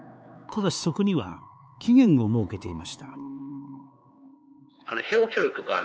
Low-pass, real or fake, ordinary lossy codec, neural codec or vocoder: none; fake; none; codec, 16 kHz, 4 kbps, X-Codec, HuBERT features, trained on LibriSpeech